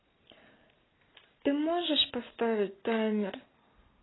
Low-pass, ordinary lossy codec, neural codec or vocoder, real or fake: 7.2 kHz; AAC, 16 kbps; none; real